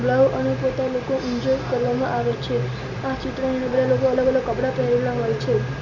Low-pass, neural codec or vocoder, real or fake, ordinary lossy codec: 7.2 kHz; none; real; none